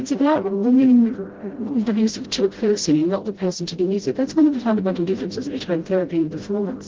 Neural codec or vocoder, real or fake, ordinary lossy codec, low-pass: codec, 16 kHz, 0.5 kbps, FreqCodec, smaller model; fake; Opus, 16 kbps; 7.2 kHz